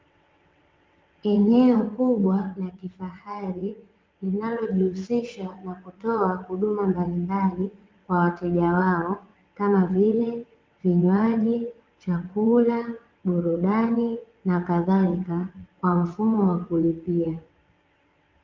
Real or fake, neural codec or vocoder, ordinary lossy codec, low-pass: fake; vocoder, 22.05 kHz, 80 mel bands, WaveNeXt; Opus, 32 kbps; 7.2 kHz